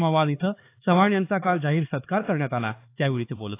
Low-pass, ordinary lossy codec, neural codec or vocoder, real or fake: 3.6 kHz; AAC, 24 kbps; codec, 16 kHz, 4 kbps, X-Codec, HuBERT features, trained on LibriSpeech; fake